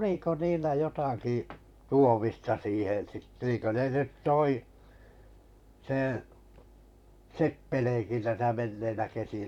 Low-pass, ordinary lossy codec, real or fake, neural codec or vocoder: 19.8 kHz; none; fake; vocoder, 44.1 kHz, 128 mel bands, Pupu-Vocoder